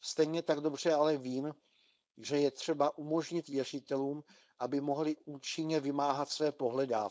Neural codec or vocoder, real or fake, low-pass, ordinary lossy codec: codec, 16 kHz, 4.8 kbps, FACodec; fake; none; none